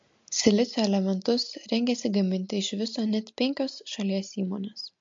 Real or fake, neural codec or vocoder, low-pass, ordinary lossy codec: real; none; 7.2 kHz; MP3, 48 kbps